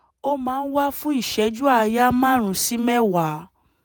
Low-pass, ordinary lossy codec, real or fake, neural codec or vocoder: none; none; fake; vocoder, 48 kHz, 128 mel bands, Vocos